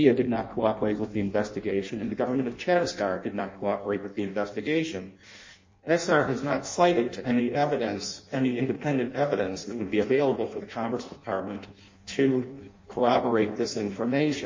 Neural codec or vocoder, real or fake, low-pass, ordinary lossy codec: codec, 16 kHz in and 24 kHz out, 0.6 kbps, FireRedTTS-2 codec; fake; 7.2 kHz; MP3, 32 kbps